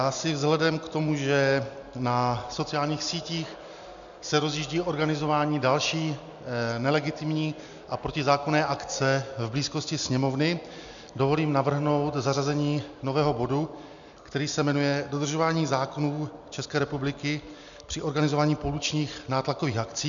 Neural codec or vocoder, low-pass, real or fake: none; 7.2 kHz; real